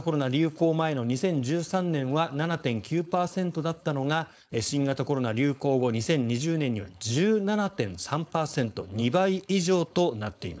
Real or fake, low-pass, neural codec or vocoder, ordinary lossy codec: fake; none; codec, 16 kHz, 4.8 kbps, FACodec; none